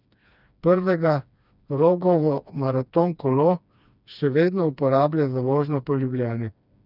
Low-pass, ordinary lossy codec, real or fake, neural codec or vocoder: 5.4 kHz; none; fake; codec, 16 kHz, 2 kbps, FreqCodec, smaller model